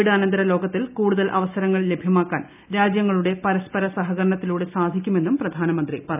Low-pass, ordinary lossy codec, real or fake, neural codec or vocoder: 3.6 kHz; none; real; none